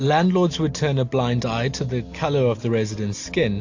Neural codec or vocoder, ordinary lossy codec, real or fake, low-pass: none; AAC, 48 kbps; real; 7.2 kHz